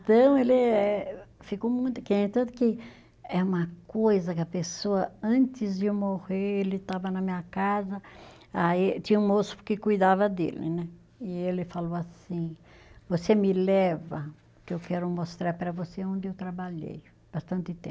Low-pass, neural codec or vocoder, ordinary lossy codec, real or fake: none; none; none; real